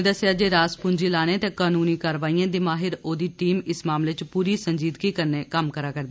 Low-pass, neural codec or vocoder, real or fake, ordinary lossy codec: none; none; real; none